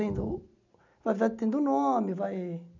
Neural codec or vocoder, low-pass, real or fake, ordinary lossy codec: none; 7.2 kHz; real; none